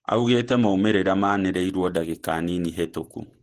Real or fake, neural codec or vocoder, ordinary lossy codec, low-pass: fake; vocoder, 48 kHz, 128 mel bands, Vocos; Opus, 16 kbps; 14.4 kHz